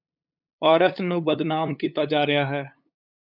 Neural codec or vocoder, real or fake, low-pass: codec, 16 kHz, 8 kbps, FunCodec, trained on LibriTTS, 25 frames a second; fake; 5.4 kHz